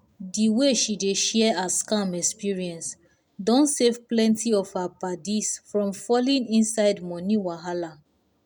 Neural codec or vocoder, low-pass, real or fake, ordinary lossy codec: none; 19.8 kHz; real; none